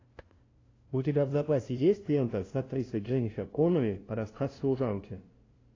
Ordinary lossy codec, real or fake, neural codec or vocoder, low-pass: AAC, 32 kbps; fake; codec, 16 kHz, 0.5 kbps, FunCodec, trained on LibriTTS, 25 frames a second; 7.2 kHz